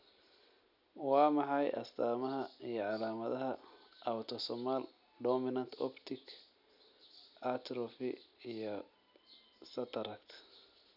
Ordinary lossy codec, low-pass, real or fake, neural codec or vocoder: none; 5.4 kHz; real; none